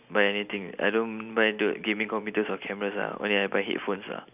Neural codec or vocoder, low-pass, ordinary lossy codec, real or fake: none; 3.6 kHz; none; real